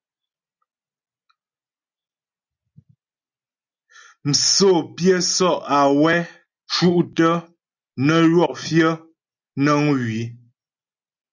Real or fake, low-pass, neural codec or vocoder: real; 7.2 kHz; none